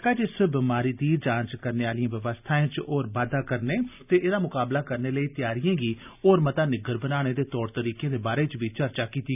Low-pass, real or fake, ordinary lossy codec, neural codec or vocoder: 3.6 kHz; real; none; none